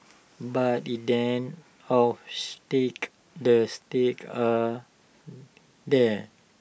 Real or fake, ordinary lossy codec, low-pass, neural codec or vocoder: real; none; none; none